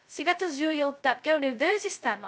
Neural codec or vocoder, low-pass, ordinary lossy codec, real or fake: codec, 16 kHz, 0.2 kbps, FocalCodec; none; none; fake